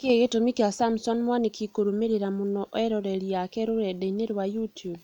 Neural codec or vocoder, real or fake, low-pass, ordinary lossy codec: none; real; 19.8 kHz; none